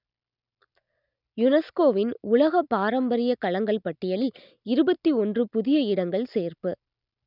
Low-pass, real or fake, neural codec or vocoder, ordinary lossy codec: 5.4 kHz; real; none; none